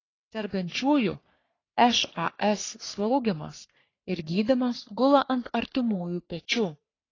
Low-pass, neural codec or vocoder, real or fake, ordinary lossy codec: 7.2 kHz; codec, 44.1 kHz, 3.4 kbps, Pupu-Codec; fake; AAC, 32 kbps